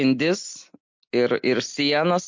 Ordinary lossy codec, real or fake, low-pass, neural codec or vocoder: MP3, 48 kbps; real; 7.2 kHz; none